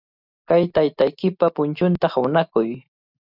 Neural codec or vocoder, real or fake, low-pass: none; real; 5.4 kHz